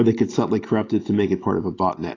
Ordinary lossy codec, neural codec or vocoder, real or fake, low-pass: AAC, 32 kbps; none; real; 7.2 kHz